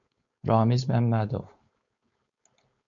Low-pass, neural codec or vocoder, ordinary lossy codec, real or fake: 7.2 kHz; codec, 16 kHz, 4.8 kbps, FACodec; MP3, 48 kbps; fake